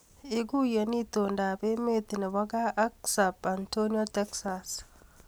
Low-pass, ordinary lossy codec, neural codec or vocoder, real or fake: none; none; none; real